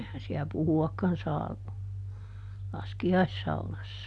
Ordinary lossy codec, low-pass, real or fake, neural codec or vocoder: none; none; real; none